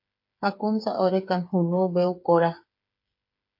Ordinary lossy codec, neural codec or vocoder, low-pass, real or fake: MP3, 32 kbps; codec, 16 kHz, 8 kbps, FreqCodec, smaller model; 5.4 kHz; fake